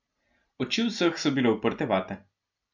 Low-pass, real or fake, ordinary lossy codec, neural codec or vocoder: 7.2 kHz; real; none; none